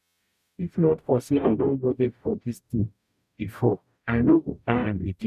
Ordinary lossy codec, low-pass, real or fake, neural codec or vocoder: none; 14.4 kHz; fake; codec, 44.1 kHz, 0.9 kbps, DAC